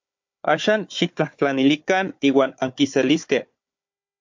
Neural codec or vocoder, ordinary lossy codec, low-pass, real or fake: codec, 16 kHz, 4 kbps, FunCodec, trained on Chinese and English, 50 frames a second; MP3, 48 kbps; 7.2 kHz; fake